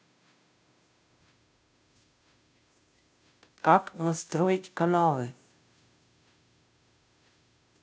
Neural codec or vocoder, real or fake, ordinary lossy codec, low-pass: codec, 16 kHz, 0.5 kbps, FunCodec, trained on Chinese and English, 25 frames a second; fake; none; none